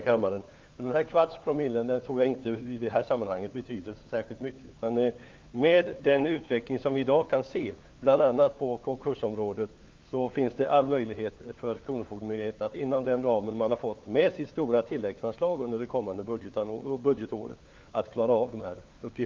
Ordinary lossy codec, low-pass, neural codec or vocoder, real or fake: Opus, 24 kbps; 7.2 kHz; codec, 16 kHz, 4 kbps, FunCodec, trained on LibriTTS, 50 frames a second; fake